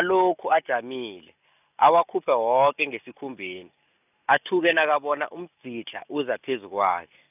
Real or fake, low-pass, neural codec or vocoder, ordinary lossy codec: real; 3.6 kHz; none; none